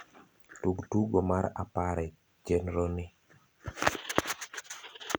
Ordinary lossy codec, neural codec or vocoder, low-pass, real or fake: none; none; none; real